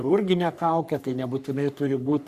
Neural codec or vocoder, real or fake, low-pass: codec, 44.1 kHz, 3.4 kbps, Pupu-Codec; fake; 14.4 kHz